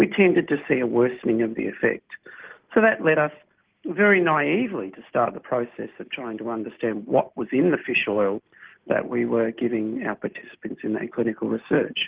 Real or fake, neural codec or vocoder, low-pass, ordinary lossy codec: real; none; 3.6 kHz; Opus, 16 kbps